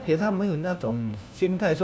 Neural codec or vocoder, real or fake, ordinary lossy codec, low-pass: codec, 16 kHz, 1 kbps, FunCodec, trained on LibriTTS, 50 frames a second; fake; none; none